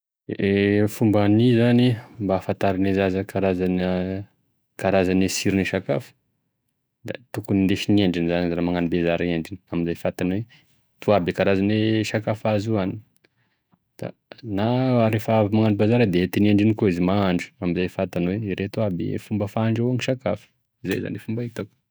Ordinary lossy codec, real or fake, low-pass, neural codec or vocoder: none; fake; none; autoencoder, 48 kHz, 128 numbers a frame, DAC-VAE, trained on Japanese speech